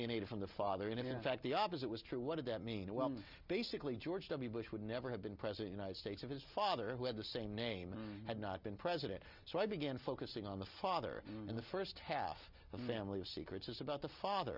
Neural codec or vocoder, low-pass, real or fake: none; 5.4 kHz; real